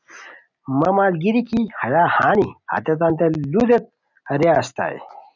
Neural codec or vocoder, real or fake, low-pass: none; real; 7.2 kHz